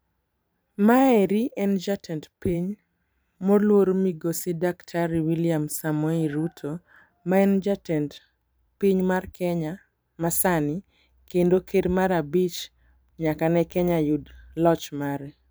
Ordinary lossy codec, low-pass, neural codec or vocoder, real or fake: none; none; vocoder, 44.1 kHz, 128 mel bands every 512 samples, BigVGAN v2; fake